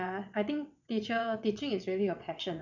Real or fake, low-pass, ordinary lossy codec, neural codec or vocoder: fake; 7.2 kHz; none; vocoder, 22.05 kHz, 80 mel bands, WaveNeXt